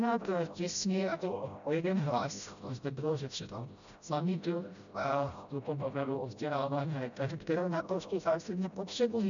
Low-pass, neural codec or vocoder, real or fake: 7.2 kHz; codec, 16 kHz, 0.5 kbps, FreqCodec, smaller model; fake